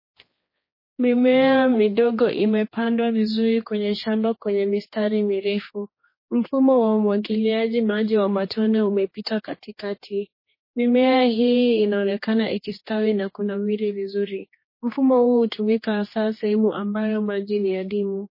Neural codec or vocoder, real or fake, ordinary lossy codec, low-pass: codec, 16 kHz, 2 kbps, X-Codec, HuBERT features, trained on general audio; fake; MP3, 24 kbps; 5.4 kHz